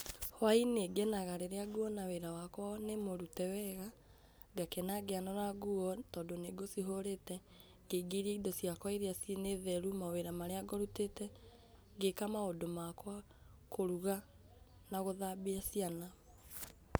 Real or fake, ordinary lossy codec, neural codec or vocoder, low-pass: real; none; none; none